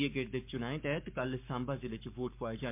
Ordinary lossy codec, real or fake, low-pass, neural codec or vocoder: MP3, 32 kbps; fake; 3.6 kHz; codec, 44.1 kHz, 7.8 kbps, Pupu-Codec